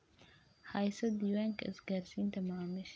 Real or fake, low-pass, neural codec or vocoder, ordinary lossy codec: real; none; none; none